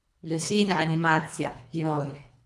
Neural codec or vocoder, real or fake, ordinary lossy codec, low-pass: codec, 24 kHz, 1.5 kbps, HILCodec; fake; none; none